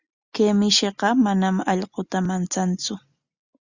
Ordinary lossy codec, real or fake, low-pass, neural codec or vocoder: Opus, 64 kbps; real; 7.2 kHz; none